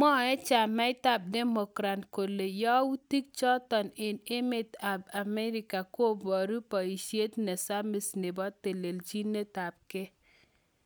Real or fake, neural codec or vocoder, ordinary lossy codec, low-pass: real; none; none; none